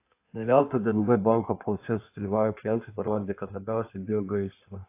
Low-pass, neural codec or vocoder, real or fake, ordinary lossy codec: 3.6 kHz; codec, 16 kHz in and 24 kHz out, 1.1 kbps, FireRedTTS-2 codec; fake; AAC, 24 kbps